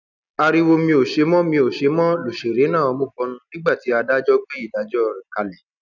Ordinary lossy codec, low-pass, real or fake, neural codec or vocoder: none; 7.2 kHz; real; none